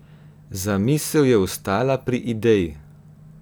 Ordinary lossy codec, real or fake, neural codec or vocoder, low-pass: none; real; none; none